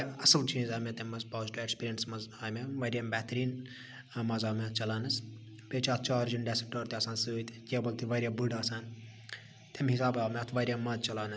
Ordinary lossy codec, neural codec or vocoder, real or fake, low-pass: none; none; real; none